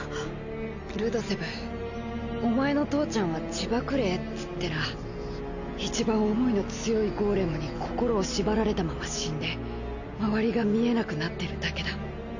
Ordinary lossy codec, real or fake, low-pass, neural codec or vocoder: none; real; 7.2 kHz; none